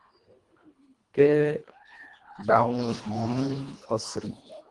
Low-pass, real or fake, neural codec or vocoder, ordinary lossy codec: 10.8 kHz; fake; codec, 24 kHz, 1.5 kbps, HILCodec; Opus, 24 kbps